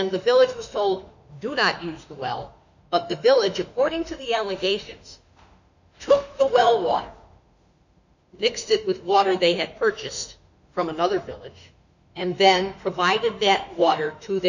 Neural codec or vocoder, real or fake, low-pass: autoencoder, 48 kHz, 32 numbers a frame, DAC-VAE, trained on Japanese speech; fake; 7.2 kHz